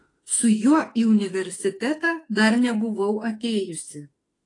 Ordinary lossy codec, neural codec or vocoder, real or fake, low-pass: AAC, 32 kbps; autoencoder, 48 kHz, 32 numbers a frame, DAC-VAE, trained on Japanese speech; fake; 10.8 kHz